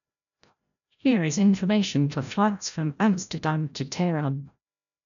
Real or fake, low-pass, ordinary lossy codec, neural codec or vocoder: fake; 7.2 kHz; none; codec, 16 kHz, 0.5 kbps, FreqCodec, larger model